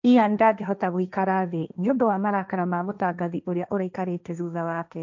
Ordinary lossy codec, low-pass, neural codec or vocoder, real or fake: none; 7.2 kHz; codec, 16 kHz, 1.1 kbps, Voila-Tokenizer; fake